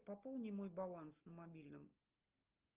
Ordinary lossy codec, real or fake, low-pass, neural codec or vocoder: Opus, 24 kbps; real; 3.6 kHz; none